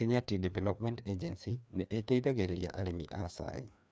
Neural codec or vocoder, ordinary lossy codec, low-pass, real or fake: codec, 16 kHz, 2 kbps, FreqCodec, larger model; none; none; fake